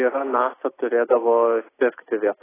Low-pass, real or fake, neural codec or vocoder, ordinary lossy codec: 3.6 kHz; real; none; AAC, 16 kbps